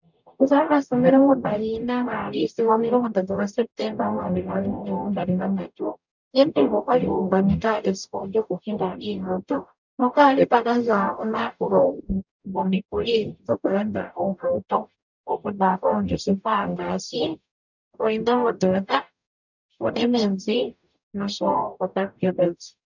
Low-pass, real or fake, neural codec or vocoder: 7.2 kHz; fake; codec, 44.1 kHz, 0.9 kbps, DAC